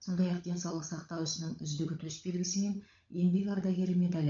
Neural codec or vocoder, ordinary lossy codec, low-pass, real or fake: codec, 16 kHz, 16 kbps, FunCodec, trained on LibriTTS, 50 frames a second; MP3, 48 kbps; 7.2 kHz; fake